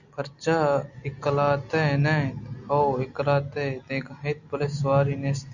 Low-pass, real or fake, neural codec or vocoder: 7.2 kHz; real; none